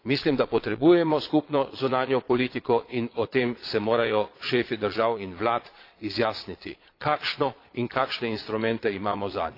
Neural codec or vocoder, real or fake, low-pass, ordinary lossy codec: vocoder, 22.05 kHz, 80 mel bands, Vocos; fake; 5.4 kHz; AAC, 32 kbps